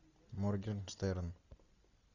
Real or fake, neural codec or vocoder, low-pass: real; none; 7.2 kHz